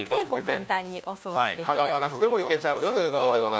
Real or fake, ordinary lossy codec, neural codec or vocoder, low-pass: fake; none; codec, 16 kHz, 1 kbps, FunCodec, trained on LibriTTS, 50 frames a second; none